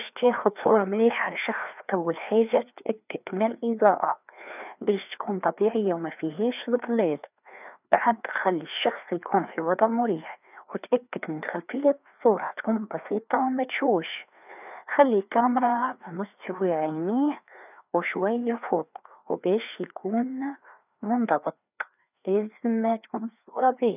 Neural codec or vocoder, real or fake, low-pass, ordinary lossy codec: codec, 16 kHz, 2 kbps, FreqCodec, larger model; fake; 3.6 kHz; none